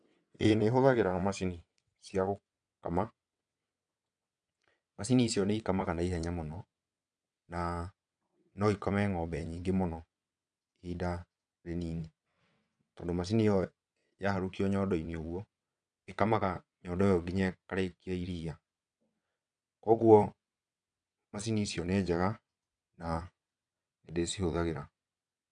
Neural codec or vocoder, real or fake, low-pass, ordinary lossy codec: vocoder, 22.05 kHz, 80 mel bands, WaveNeXt; fake; 9.9 kHz; none